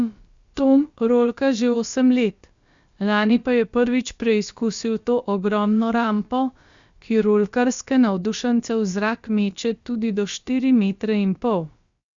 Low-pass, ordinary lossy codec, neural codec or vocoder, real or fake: 7.2 kHz; Opus, 64 kbps; codec, 16 kHz, about 1 kbps, DyCAST, with the encoder's durations; fake